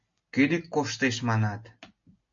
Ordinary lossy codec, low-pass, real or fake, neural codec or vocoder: MP3, 48 kbps; 7.2 kHz; real; none